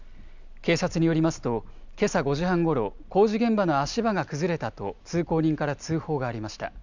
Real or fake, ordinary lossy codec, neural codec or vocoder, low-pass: real; none; none; 7.2 kHz